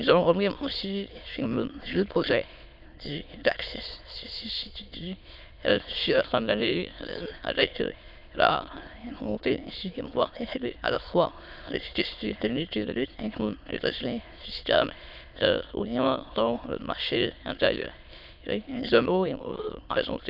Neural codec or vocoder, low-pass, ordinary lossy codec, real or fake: autoencoder, 22.05 kHz, a latent of 192 numbers a frame, VITS, trained on many speakers; 5.4 kHz; none; fake